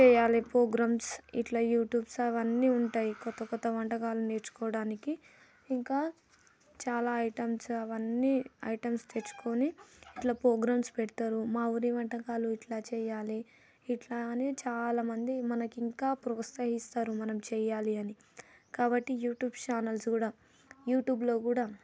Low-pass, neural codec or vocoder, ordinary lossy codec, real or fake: none; none; none; real